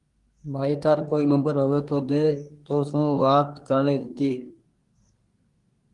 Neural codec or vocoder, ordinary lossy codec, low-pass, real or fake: codec, 24 kHz, 1 kbps, SNAC; Opus, 24 kbps; 10.8 kHz; fake